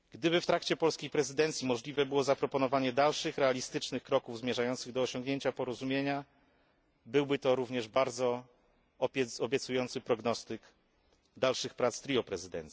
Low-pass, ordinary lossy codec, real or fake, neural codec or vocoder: none; none; real; none